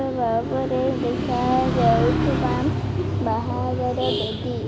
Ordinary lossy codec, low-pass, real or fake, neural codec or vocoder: none; none; real; none